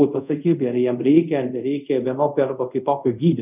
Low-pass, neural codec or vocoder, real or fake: 3.6 kHz; codec, 24 kHz, 0.5 kbps, DualCodec; fake